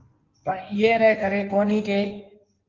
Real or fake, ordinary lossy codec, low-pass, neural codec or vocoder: fake; Opus, 24 kbps; 7.2 kHz; codec, 16 kHz in and 24 kHz out, 1.1 kbps, FireRedTTS-2 codec